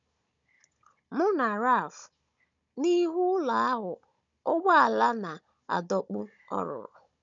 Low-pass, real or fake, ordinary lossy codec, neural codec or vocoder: 7.2 kHz; fake; none; codec, 16 kHz, 16 kbps, FunCodec, trained on Chinese and English, 50 frames a second